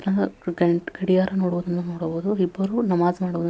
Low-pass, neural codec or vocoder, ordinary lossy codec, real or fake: none; none; none; real